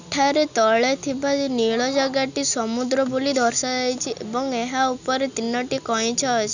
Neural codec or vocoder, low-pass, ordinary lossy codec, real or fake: none; 7.2 kHz; none; real